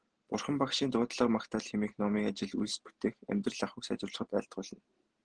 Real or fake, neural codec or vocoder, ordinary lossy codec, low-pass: real; none; Opus, 16 kbps; 9.9 kHz